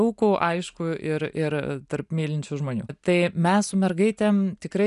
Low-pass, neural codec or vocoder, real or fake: 10.8 kHz; none; real